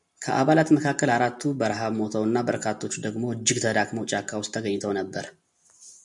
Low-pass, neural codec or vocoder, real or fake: 10.8 kHz; none; real